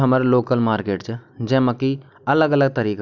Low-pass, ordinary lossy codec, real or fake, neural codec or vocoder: 7.2 kHz; none; real; none